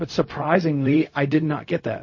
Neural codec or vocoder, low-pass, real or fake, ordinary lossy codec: codec, 16 kHz, 0.4 kbps, LongCat-Audio-Codec; 7.2 kHz; fake; MP3, 32 kbps